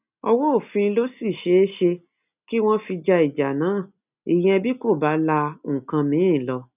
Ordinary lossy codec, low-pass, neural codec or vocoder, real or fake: none; 3.6 kHz; none; real